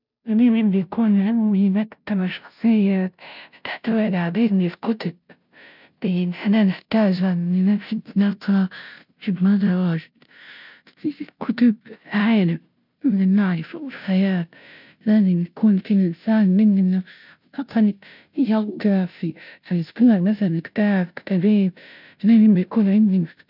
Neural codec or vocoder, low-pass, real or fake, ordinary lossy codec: codec, 16 kHz, 0.5 kbps, FunCodec, trained on Chinese and English, 25 frames a second; 5.4 kHz; fake; none